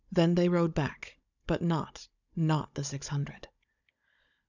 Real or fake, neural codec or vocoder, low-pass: fake; codec, 16 kHz, 16 kbps, FunCodec, trained on Chinese and English, 50 frames a second; 7.2 kHz